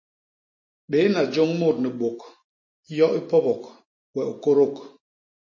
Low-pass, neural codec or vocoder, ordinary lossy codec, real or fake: 7.2 kHz; none; MP3, 32 kbps; real